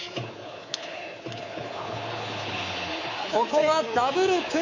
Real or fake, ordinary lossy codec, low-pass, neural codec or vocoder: fake; MP3, 64 kbps; 7.2 kHz; codec, 24 kHz, 3.1 kbps, DualCodec